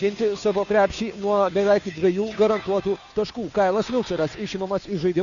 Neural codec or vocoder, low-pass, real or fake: codec, 16 kHz, 2 kbps, FunCodec, trained on Chinese and English, 25 frames a second; 7.2 kHz; fake